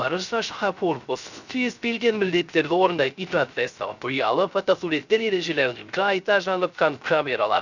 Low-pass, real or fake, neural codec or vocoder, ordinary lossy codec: 7.2 kHz; fake; codec, 16 kHz, 0.3 kbps, FocalCodec; none